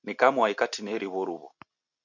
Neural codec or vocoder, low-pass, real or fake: none; 7.2 kHz; real